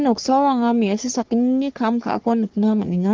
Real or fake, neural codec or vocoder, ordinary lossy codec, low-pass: fake; codec, 44.1 kHz, 3.4 kbps, Pupu-Codec; Opus, 16 kbps; 7.2 kHz